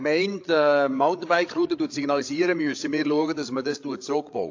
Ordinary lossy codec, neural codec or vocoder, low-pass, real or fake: AAC, 48 kbps; codec, 16 kHz, 8 kbps, FreqCodec, larger model; 7.2 kHz; fake